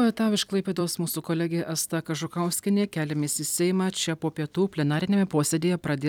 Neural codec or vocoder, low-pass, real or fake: vocoder, 44.1 kHz, 128 mel bands every 256 samples, BigVGAN v2; 19.8 kHz; fake